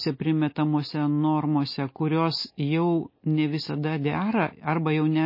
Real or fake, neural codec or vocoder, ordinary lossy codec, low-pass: real; none; MP3, 24 kbps; 5.4 kHz